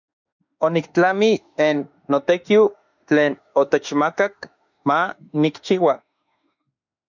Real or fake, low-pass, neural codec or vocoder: fake; 7.2 kHz; autoencoder, 48 kHz, 32 numbers a frame, DAC-VAE, trained on Japanese speech